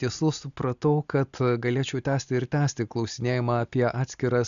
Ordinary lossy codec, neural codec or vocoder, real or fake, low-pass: AAC, 96 kbps; none; real; 7.2 kHz